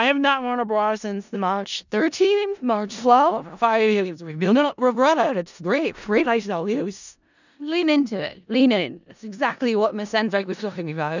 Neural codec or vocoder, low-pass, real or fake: codec, 16 kHz in and 24 kHz out, 0.4 kbps, LongCat-Audio-Codec, four codebook decoder; 7.2 kHz; fake